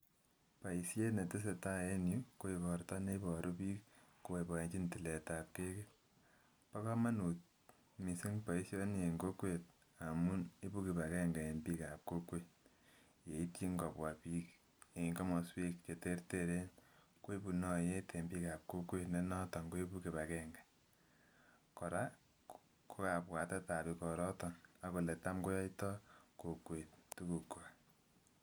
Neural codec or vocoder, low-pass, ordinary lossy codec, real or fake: none; none; none; real